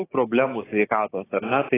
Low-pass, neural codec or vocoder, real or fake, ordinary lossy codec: 3.6 kHz; codec, 16 kHz, 6 kbps, DAC; fake; AAC, 16 kbps